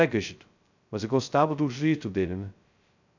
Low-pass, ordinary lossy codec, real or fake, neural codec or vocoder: 7.2 kHz; none; fake; codec, 16 kHz, 0.2 kbps, FocalCodec